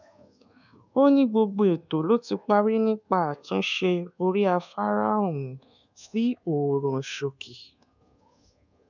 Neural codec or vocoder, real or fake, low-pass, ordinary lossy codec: codec, 24 kHz, 1.2 kbps, DualCodec; fake; 7.2 kHz; none